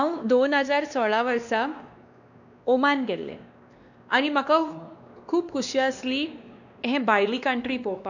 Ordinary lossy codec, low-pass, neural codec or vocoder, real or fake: none; 7.2 kHz; codec, 16 kHz, 2 kbps, X-Codec, WavLM features, trained on Multilingual LibriSpeech; fake